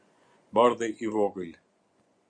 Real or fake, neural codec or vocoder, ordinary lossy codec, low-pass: fake; vocoder, 24 kHz, 100 mel bands, Vocos; Opus, 64 kbps; 9.9 kHz